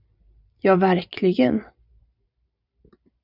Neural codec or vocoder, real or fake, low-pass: vocoder, 44.1 kHz, 128 mel bands every 512 samples, BigVGAN v2; fake; 5.4 kHz